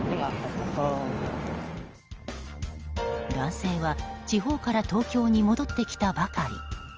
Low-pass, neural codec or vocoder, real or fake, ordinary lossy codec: 7.2 kHz; none; real; Opus, 24 kbps